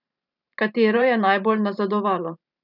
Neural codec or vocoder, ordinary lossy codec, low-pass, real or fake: vocoder, 44.1 kHz, 128 mel bands every 512 samples, BigVGAN v2; none; 5.4 kHz; fake